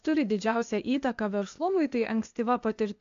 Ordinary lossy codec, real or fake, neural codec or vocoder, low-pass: AAC, 64 kbps; fake; codec, 16 kHz, 0.8 kbps, ZipCodec; 7.2 kHz